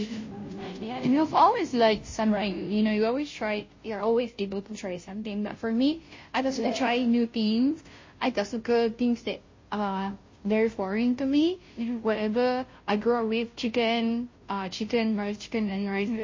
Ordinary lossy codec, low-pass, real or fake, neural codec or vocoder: MP3, 32 kbps; 7.2 kHz; fake; codec, 16 kHz, 0.5 kbps, FunCodec, trained on Chinese and English, 25 frames a second